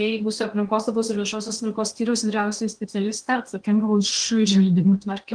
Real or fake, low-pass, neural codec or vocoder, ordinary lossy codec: fake; 9.9 kHz; codec, 16 kHz in and 24 kHz out, 0.8 kbps, FocalCodec, streaming, 65536 codes; Opus, 24 kbps